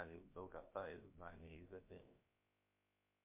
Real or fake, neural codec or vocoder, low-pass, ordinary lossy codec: fake; codec, 16 kHz, about 1 kbps, DyCAST, with the encoder's durations; 3.6 kHz; MP3, 24 kbps